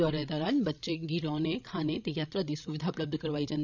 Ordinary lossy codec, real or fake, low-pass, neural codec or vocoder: none; fake; 7.2 kHz; codec, 16 kHz, 16 kbps, FreqCodec, larger model